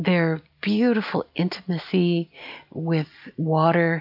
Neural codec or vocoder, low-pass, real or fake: none; 5.4 kHz; real